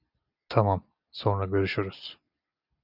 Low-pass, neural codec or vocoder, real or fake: 5.4 kHz; none; real